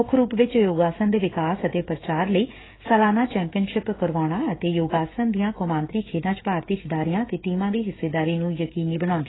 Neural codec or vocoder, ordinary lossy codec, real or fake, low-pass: codec, 16 kHz, 16 kbps, FreqCodec, smaller model; AAC, 16 kbps; fake; 7.2 kHz